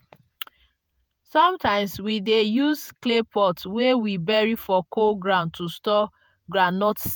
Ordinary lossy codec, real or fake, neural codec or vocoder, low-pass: none; fake; vocoder, 48 kHz, 128 mel bands, Vocos; none